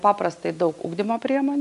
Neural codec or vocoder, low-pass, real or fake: none; 10.8 kHz; real